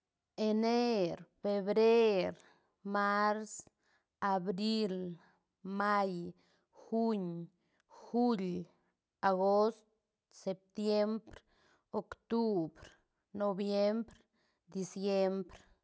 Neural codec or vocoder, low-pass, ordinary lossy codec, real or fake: none; none; none; real